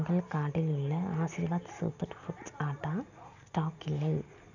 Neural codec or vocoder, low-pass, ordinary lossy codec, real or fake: none; 7.2 kHz; none; real